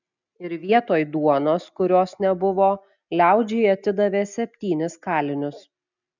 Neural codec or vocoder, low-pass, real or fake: none; 7.2 kHz; real